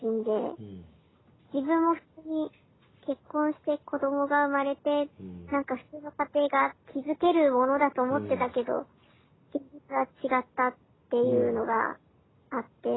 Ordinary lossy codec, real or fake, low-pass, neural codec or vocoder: AAC, 16 kbps; real; 7.2 kHz; none